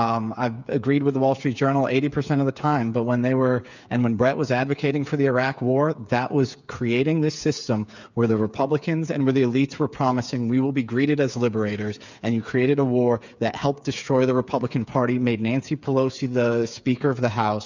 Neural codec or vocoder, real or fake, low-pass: codec, 16 kHz, 8 kbps, FreqCodec, smaller model; fake; 7.2 kHz